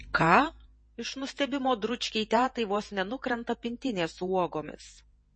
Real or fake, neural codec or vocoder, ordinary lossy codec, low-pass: fake; vocoder, 48 kHz, 128 mel bands, Vocos; MP3, 32 kbps; 9.9 kHz